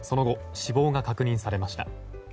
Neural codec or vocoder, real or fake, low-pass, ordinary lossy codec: none; real; none; none